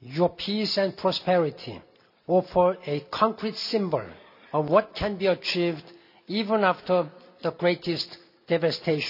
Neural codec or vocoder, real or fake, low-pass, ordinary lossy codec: none; real; 5.4 kHz; none